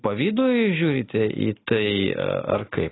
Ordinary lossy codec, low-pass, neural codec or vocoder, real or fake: AAC, 16 kbps; 7.2 kHz; none; real